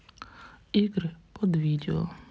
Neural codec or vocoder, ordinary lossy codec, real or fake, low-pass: none; none; real; none